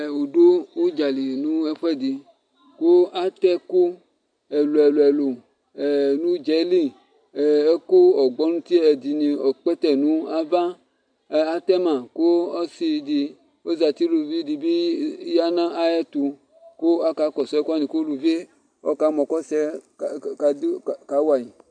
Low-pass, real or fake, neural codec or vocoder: 9.9 kHz; real; none